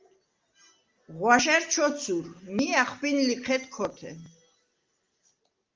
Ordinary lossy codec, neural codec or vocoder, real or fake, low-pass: Opus, 32 kbps; none; real; 7.2 kHz